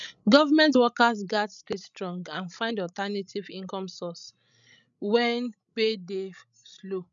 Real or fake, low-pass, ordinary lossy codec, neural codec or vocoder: fake; 7.2 kHz; none; codec, 16 kHz, 16 kbps, FreqCodec, larger model